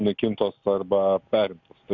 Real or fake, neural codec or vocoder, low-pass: real; none; 7.2 kHz